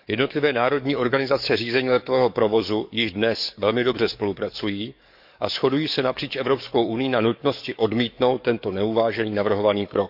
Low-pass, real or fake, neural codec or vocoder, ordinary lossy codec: 5.4 kHz; fake; codec, 44.1 kHz, 7.8 kbps, DAC; none